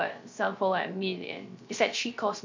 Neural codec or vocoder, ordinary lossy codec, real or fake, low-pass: codec, 16 kHz, 0.3 kbps, FocalCodec; MP3, 64 kbps; fake; 7.2 kHz